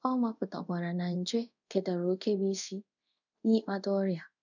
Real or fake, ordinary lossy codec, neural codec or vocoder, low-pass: fake; none; codec, 24 kHz, 0.5 kbps, DualCodec; 7.2 kHz